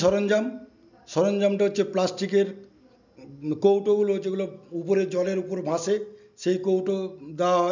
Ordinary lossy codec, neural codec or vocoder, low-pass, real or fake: none; none; 7.2 kHz; real